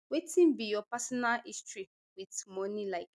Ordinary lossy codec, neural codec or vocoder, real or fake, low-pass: none; none; real; none